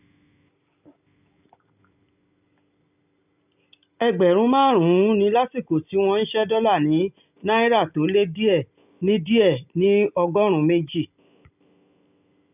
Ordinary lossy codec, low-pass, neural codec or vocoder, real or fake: none; 3.6 kHz; none; real